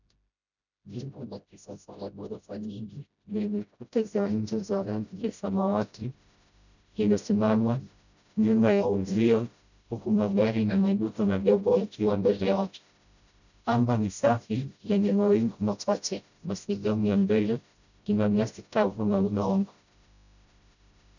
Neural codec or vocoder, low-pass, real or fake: codec, 16 kHz, 0.5 kbps, FreqCodec, smaller model; 7.2 kHz; fake